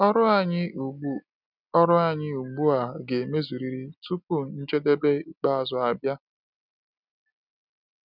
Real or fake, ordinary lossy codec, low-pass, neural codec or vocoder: real; none; 5.4 kHz; none